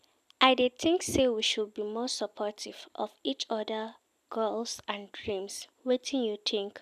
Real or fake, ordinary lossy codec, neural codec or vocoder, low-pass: real; none; none; 14.4 kHz